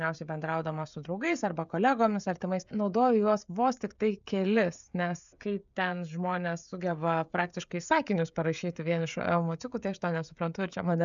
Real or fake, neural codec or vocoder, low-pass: fake; codec, 16 kHz, 16 kbps, FreqCodec, smaller model; 7.2 kHz